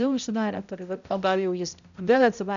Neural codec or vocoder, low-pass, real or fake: codec, 16 kHz, 0.5 kbps, X-Codec, HuBERT features, trained on balanced general audio; 7.2 kHz; fake